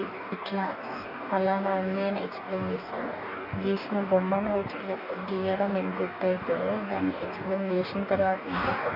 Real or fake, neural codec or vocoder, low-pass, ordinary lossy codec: fake; codec, 44.1 kHz, 2.6 kbps, DAC; 5.4 kHz; none